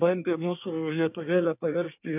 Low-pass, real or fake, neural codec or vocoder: 3.6 kHz; fake; codec, 24 kHz, 1 kbps, SNAC